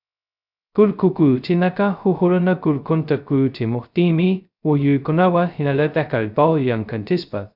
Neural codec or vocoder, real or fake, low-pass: codec, 16 kHz, 0.2 kbps, FocalCodec; fake; 5.4 kHz